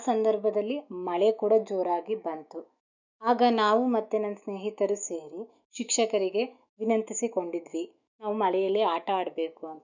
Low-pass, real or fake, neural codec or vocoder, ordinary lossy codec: 7.2 kHz; fake; autoencoder, 48 kHz, 128 numbers a frame, DAC-VAE, trained on Japanese speech; none